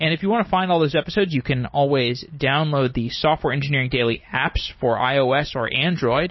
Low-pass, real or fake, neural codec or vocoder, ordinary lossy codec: 7.2 kHz; fake; vocoder, 44.1 kHz, 128 mel bands every 256 samples, BigVGAN v2; MP3, 24 kbps